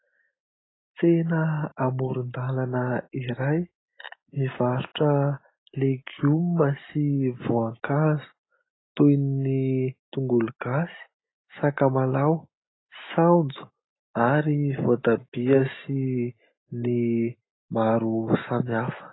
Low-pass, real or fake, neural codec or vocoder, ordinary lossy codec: 7.2 kHz; real; none; AAC, 16 kbps